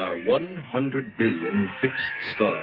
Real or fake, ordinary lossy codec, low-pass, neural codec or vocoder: fake; Opus, 32 kbps; 5.4 kHz; codec, 32 kHz, 1.9 kbps, SNAC